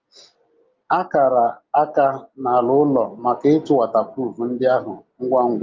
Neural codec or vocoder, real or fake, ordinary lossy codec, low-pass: none; real; Opus, 24 kbps; 7.2 kHz